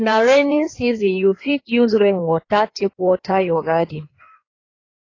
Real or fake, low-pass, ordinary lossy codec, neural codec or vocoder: fake; 7.2 kHz; AAC, 32 kbps; codec, 16 kHz in and 24 kHz out, 1.1 kbps, FireRedTTS-2 codec